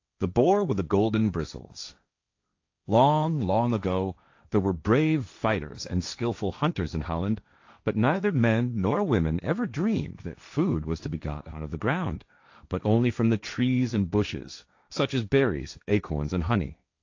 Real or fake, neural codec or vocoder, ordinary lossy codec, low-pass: fake; codec, 16 kHz, 1.1 kbps, Voila-Tokenizer; AAC, 48 kbps; 7.2 kHz